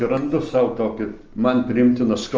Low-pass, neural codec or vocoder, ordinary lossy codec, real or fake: 7.2 kHz; none; Opus, 24 kbps; real